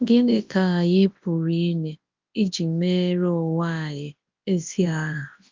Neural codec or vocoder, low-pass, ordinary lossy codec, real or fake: codec, 24 kHz, 0.9 kbps, WavTokenizer, large speech release; 7.2 kHz; Opus, 32 kbps; fake